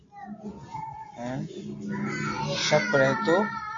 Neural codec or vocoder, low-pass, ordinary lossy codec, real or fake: none; 7.2 kHz; AAC, 32 kbps; real